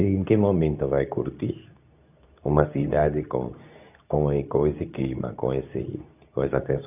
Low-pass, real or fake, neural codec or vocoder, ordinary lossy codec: 3.6 kHz; fake; codec, 24 kHz, 0.9 kbps, WavTokenizer, medium speech release version 2; none